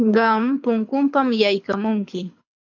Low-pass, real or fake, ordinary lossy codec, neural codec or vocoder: 7.2 kHz; fake; MP3, 64 kbps; codec, 24 kHz, 6 kbps, HILCodec